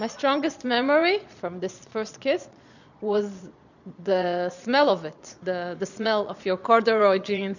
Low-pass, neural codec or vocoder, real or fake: 7.2 kHz; vocoder, 22.05 kHz, 80 mel bands, Vocos; fake